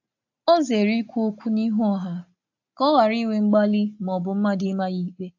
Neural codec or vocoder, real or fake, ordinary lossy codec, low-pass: none; real; AAC, 48 kbps; 7.2 kHz